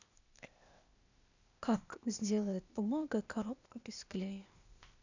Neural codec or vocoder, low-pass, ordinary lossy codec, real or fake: codec, 16 kHz, 0.8 kbps, ZipCodec; 7.2 kHz; none; fake